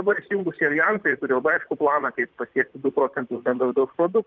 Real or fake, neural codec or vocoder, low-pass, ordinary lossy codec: fake; vocoder, 44.1 kHz, 128 mel bands, Pupu-Vocoder; 7.2 kHz; Opus, 24 kbps